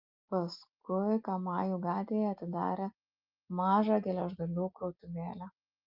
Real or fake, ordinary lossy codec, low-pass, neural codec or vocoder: real; Opus, 32 kbps; 5.4 kHz; none